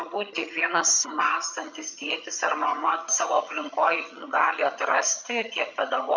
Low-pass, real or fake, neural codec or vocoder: 7.2 kHz; fake; vocoder, 22.05 kHz, 80 mel bands, HiFi-GAN